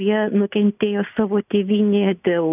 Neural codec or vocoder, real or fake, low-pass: none; real; 3.6 kHz